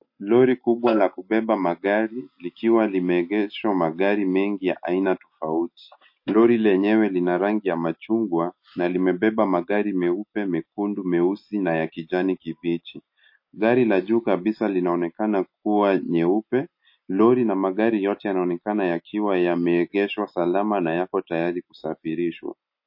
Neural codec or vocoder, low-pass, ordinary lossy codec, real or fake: none; 5.4 kHz; MP3, 32 kbps; real